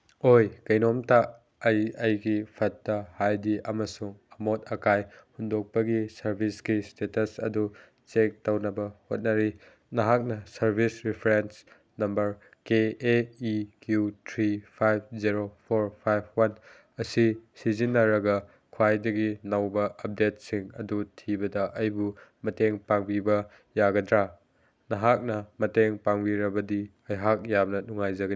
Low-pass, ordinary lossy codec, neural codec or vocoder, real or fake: none; none; none; real